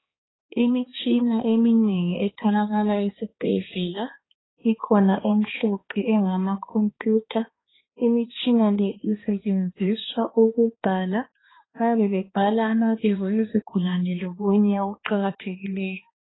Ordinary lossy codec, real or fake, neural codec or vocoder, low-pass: AAC, 16 kbps; fake; codec, 16 kHz, 2 kbps, X-Codec, HuBERT features, trained on balanced general audio; 7.2 kHz